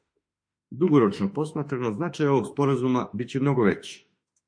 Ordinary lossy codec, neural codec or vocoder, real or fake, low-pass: MP3, 48 kbps; autoencoder, 48 kHz, 32 numbers a frame, DAC-VAE, trained on Japanese speech; fake; 9.9 kHz